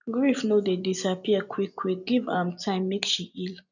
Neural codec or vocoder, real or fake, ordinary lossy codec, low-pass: none; real; none; 7.2 kHz